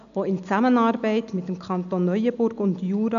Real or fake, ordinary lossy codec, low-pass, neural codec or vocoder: real; none; 7.2 kHz; none